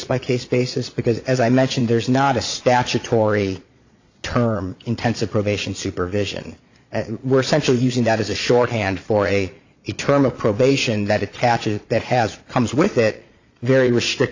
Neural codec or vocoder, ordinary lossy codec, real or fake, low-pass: vocoder, 22.05 kHz, 80 mel bands, Vocos; AAC, 48 kbps; fake; 7.2 kHz